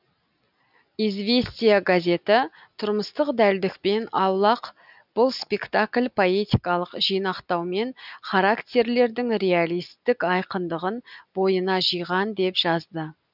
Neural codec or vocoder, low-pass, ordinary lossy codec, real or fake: none; 5.4 kHz; none; real